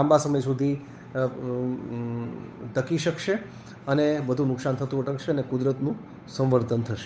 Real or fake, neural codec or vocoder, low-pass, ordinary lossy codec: fake; codec, 16 kHz, 8 kbps, FunCodec, trained on Chinese and English, 25 frames a second; none; none